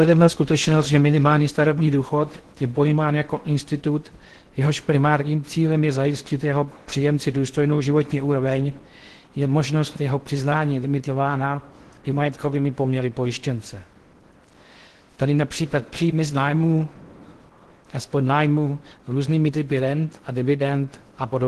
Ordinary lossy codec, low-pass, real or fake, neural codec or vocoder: Opus, 16 kbps; 10.8 kHz; fake; codec, 16 kHz in and 24 kHz out, 0.6 kbps, FocalCodec, streaming, 4096 codes